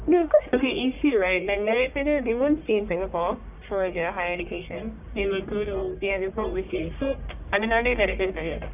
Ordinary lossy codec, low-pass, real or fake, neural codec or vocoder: none; 3.6 kHz; fake; codec, 44.1 kHz, 1.7 kbps, Pupu-Codec